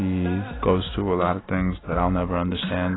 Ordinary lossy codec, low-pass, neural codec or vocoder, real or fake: AAC, 16 kbps; 7.2 kHz; none; real